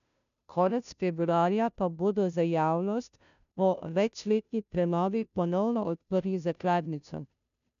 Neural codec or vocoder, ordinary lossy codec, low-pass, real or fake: codec, 16 kHz, 0.5 kbps, FunCodec, trained on Chinese and English, 25 frames a second; none; 7.2 kHz; fake